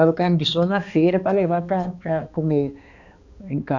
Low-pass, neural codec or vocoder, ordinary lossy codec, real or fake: 7.2 kHz; codec, 16 kHz, 2 kbps, X-Codec, HuBERT features, trained on balanced general audio; none; fake